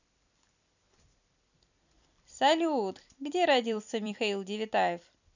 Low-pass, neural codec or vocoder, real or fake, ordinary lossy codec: 7.2 kHz; vocoder, 44.1 kHz, 128 mel bands every 256 samples, BigVGAN v2; fake; none